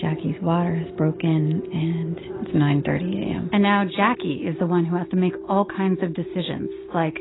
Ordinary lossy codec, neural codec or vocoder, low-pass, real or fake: AAC, 16 kbps; none; 7.2 kHz; real